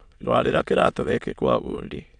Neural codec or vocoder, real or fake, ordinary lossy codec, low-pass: autoencoder, 22.05 kHz, a latent of 192 numbers a frame, VITS, trained on many speakers; fake; none; 9.9 kHz